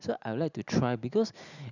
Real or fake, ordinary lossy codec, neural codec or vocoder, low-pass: real; none; none; 7.2 kHz